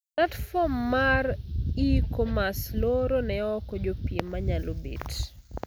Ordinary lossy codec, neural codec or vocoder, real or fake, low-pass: none; none; real; none